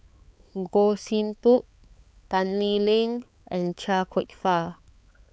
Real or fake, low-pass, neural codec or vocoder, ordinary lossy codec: fake; none; codec, 16 kHz, 4 kbps, X-Codec, HuBERT features, trained on balanced general audio; none